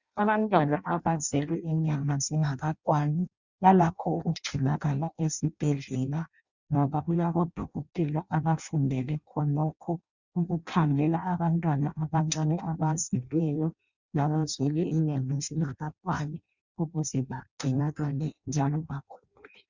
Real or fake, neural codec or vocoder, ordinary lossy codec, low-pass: fake; codec, 16 kHz in and 24 kHz out, 0.6 kbps, FireRedTTS-2 codec; Opus, 64 kbps; 7.2 kHz